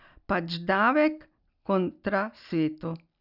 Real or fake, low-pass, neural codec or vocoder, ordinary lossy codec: real; 5.4 kHz; none; none